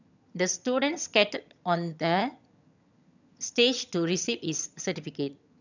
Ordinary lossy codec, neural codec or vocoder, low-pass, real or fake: none; vocoder, 22.05 kHz, 80 mel bands, HiFi-GAN; 7.2 kHz; fake